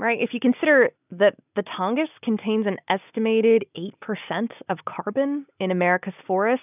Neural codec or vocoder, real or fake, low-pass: none; real; 3.6 kHz